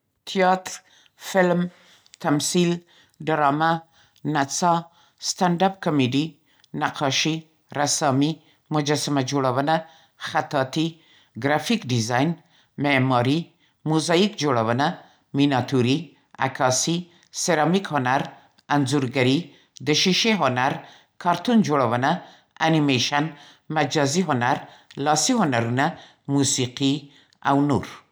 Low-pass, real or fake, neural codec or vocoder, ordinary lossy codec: none; real; none; none